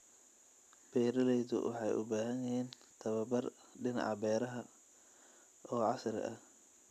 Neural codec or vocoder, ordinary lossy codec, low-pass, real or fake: none; none; 14.4 kHz; real